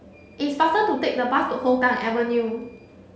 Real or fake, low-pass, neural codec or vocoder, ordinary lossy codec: real; none; none; none